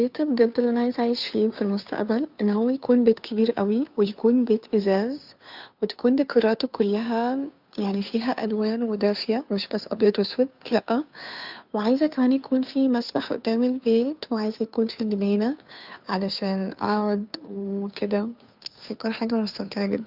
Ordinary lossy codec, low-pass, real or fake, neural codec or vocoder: none; 5.4 kHz; fake; codec, 16 kHz, 2 kbps, FunCodec, trained on Chinese and English, 25 frames a second